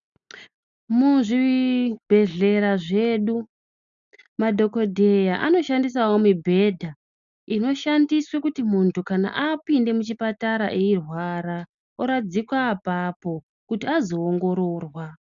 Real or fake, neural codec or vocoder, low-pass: real; none; 7.2 kHz